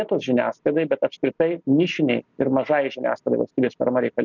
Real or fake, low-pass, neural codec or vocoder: real; 7.2 kHz; none